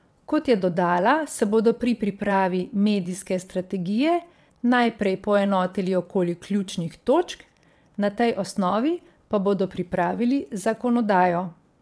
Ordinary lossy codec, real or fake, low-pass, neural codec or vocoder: none; fake; none; vocoder, 22.05 kHz, 80 mel bands, WaveNeXt